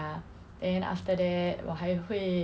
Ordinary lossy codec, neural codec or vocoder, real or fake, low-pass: none; none; real; none